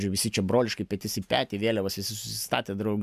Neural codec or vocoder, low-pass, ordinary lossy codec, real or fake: none; 14.4 kHz; MP3, 96 kbps; real